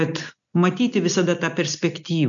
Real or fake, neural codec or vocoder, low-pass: real; none; 7.2 kHz